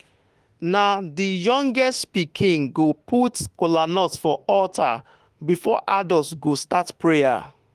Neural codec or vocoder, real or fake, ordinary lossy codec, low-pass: autoencoder, 48 kHz, 32 numbers a frame, DAC-VAE, trained on Japanese speech; fake; Opus, 24 kbps; 14.4 kHz